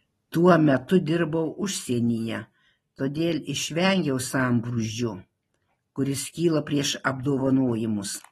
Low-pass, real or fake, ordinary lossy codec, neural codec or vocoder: 19.8 kHz; real; AAC, 32 kbps; none